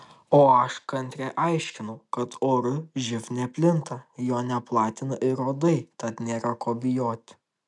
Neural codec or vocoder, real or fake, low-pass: none; real; 10.8 kHz